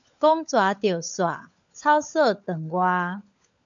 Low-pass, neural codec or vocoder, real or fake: 7.2 kHz; codec, 16 kHz, 16 kbps, FunCodec, trained on LibriTTS, 50 frames a second; fake